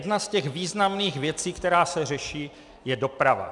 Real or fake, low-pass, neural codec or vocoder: real; 10.8 kHz; none